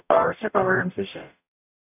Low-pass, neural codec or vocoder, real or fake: 3.6 kHz; codec, 44.1 kHz, 0.9 kbps, DAC; fake